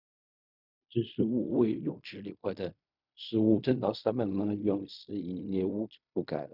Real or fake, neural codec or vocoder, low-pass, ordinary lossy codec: fake; codec, 16 kHz in and 24 kHz out, 0.4 kbps, LongCat-Audio-Codec, fine tuned four codebook decoder; 5.4 kHz; none